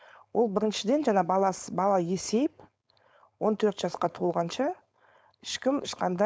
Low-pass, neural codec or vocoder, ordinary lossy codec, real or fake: none; codec, 16 kHz, 4.8 kbps, FACodec; none; fake